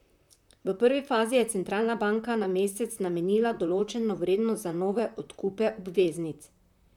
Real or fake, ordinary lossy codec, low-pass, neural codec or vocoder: fake; none; 19.8 kHz; vocoder, 44.1 kHz, 128 mel bands, Pupu-Vocoder